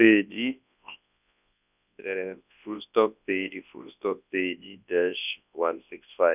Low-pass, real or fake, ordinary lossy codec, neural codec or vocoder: 3.6 kHz; fake; none; codec, 24 kHz, 0.9 kbps, WavTokenizer, large speech release